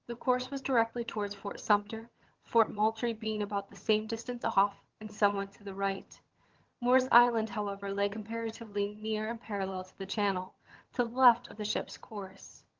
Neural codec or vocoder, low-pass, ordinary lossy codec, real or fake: vocoder, 22.05 kHz, 80 mel bands, HiFi-GAN; 7.2 kHz; Opus, 16 kbps; fake